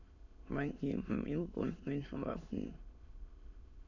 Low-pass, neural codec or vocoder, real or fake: 7.2 kHz; autoencoder, 22.05 kHz, a latent of 192 numbers a frame, VITS, trained on many speakers; fake